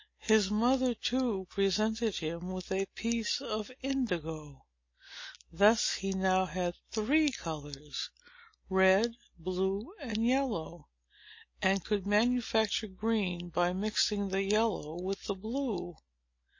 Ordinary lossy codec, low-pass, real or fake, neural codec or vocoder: MP3, 32 kbps; 7.2 kHz; real; none